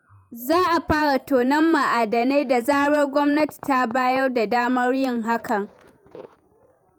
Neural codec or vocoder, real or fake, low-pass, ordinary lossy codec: vocoder, 48 kHz, 128 mel bands, Vocos; fake; none; none